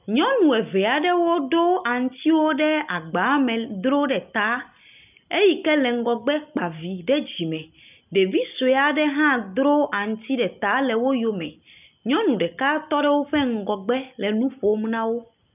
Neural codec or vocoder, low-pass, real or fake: none; 3.6 kHz; real